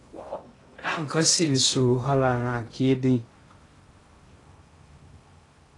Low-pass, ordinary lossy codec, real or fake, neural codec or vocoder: 10.8 kHz; AAC, 32 kbps; fake; codec, 16 kHz in and 24 kHz out, 0.6 kbps, FocalCodec, streaming, 2048 codes